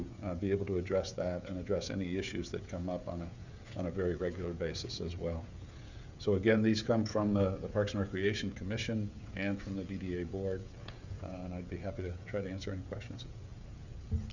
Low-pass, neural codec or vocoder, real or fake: 7.2 kHz; codec, 16 kHz, 16 kbps, FreqCodec, smaller model; fake